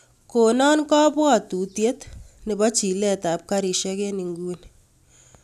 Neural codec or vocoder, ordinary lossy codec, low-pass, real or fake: none; none; 14.4 kHz; real